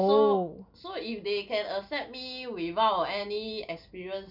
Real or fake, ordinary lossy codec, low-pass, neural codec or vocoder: real; none; 5.4 kHz; none